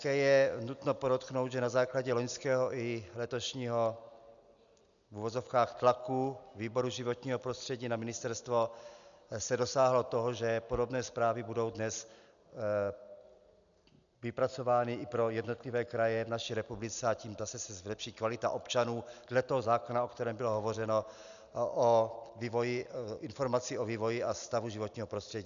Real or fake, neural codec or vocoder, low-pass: real; none; 7.2 kHz